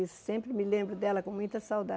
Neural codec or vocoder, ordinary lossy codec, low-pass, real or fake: none; none; none; real